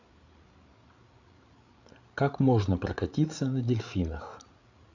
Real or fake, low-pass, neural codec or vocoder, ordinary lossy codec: fake; 7.2 kHz; codec, 16 kHz, 8 kbps, FreqCodec, larger model; none